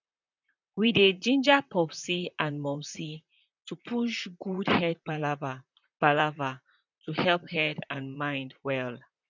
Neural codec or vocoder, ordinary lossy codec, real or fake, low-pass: vocoder, 44.1 kHz, 128 mel bands, Pupu-Vocoder; none; fake; 7.2 kHz